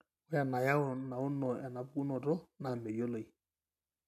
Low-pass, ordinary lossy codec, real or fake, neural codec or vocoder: 14.4 kHz; none; real; none